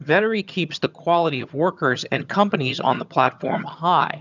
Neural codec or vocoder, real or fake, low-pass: vocoder, 22.05 kHz, 80 mel bands, HiFi-GAN; fake; 7.2 kHz